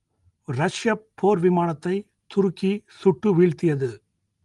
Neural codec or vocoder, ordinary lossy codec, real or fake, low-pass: none; Opus, 24 kbps; real; 10.8 kHz